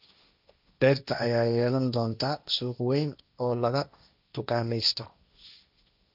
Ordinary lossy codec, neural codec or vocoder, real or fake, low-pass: none; codec, 16 kHz, 1.1 kbps, Voila-Tokenizer; fake; 5.4 kHz